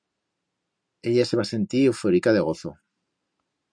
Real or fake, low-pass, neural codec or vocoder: real; 9.9 kHz; none